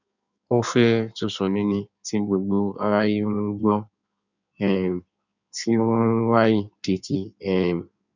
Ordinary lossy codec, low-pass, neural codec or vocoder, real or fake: none; 7.2 kHz; codec, 16 kHz in and 24 kHz out, 1.1 kbps, FireRedTTS-2 codec; fake